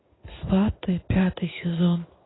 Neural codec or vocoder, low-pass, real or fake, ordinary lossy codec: codec, 16 kHz in and 24 kHz out, 1 kbps, XY-Tokenizer; 7.2 kHz; fake; AAC, 16 kbps